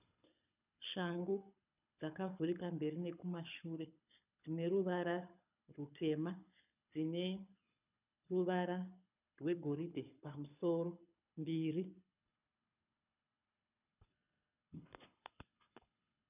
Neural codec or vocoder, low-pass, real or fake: codec, 24 kHz, 6 kbps, HILCodec; 3.6 kHz; fake